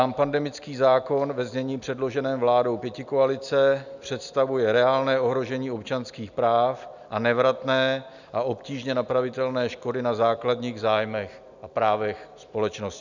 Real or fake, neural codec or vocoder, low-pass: real; none; 7.2 kHz